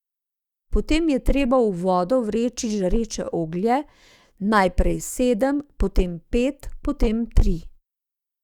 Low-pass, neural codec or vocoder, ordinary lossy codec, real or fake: 19.8 kHz; autoencoder, 48 kHz, 128 numbers a frame, DAC-VAE, trained on Japanese speech; Opus, 64 kbps; fake